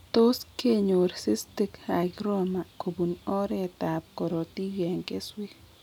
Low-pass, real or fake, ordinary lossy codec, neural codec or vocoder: 19.8 kHz; real; none; none